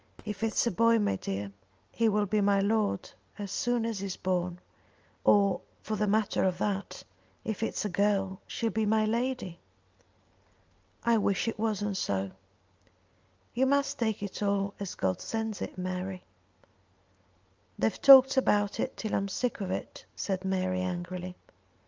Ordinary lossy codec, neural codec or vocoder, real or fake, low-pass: Opus, 24 kbps; none; real; 7.2 kHz